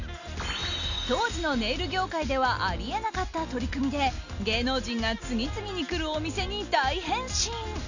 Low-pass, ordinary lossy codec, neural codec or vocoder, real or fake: 7.2 kHz; none; none; real